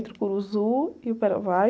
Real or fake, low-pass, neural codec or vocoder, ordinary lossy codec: real; none; none; none